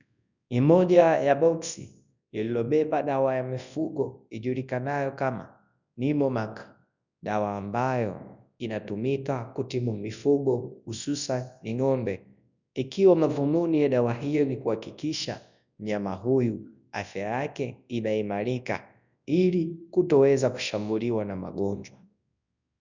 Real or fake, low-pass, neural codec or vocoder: fake; 7.2 kHz; codec, 24 kHz, 0.9 kbps, WavTokenizer, large speech release